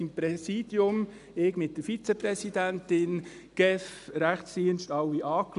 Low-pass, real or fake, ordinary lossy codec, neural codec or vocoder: 10.8 kHz; real; none; none